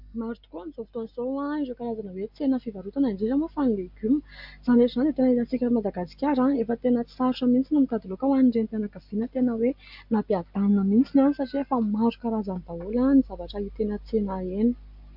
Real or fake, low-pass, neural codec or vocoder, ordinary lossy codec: real; 5.4 kHz; none; MP3, 48 kbps